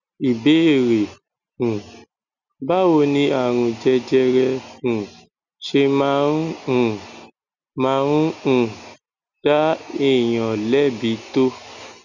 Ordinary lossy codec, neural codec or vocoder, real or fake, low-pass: none; none; real; 7.2 kHz